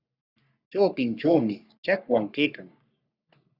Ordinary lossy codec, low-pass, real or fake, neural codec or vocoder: Opus, 64 kbps; 5.4 kHz; fake; codec, 44.1 kHz, 3.4 kbps, Pupu-Codec